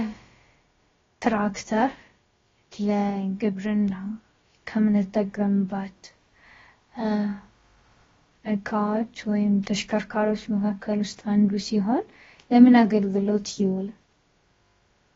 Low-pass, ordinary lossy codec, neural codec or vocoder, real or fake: 7.2 kHz; AAC, 24 kbps; codec, 16 kHz, about 1 kbps, DyCAST, with the encoder's durations; fake